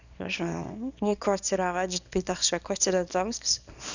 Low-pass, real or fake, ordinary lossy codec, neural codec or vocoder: 7.2 kHz; fake; none; codec, 24 kHz, 0.9 kbps, WavTokenizer, small release